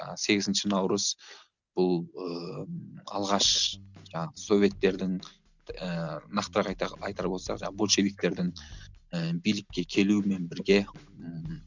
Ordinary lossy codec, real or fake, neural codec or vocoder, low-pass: none; real; none; 7.2 kHz